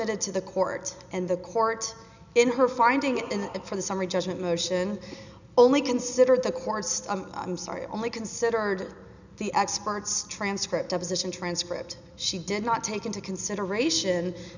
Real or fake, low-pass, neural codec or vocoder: real; 7.2 kHz; none